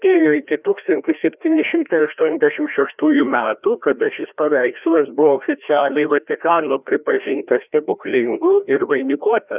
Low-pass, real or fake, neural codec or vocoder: 3.6 kHz; fake; codec, 16 kHz, 1 kbps, FreqCodec, larger model